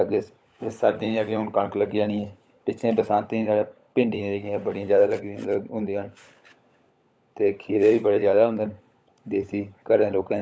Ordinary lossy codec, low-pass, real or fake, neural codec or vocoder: none; none; fake; codec, 16 kHz, 16 kbps, FunCodec, trained on LibriTTS, 50 frames a second